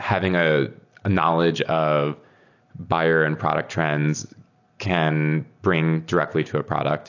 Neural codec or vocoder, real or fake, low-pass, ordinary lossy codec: none; real; 7.2 kHz; AAC, 48 kbps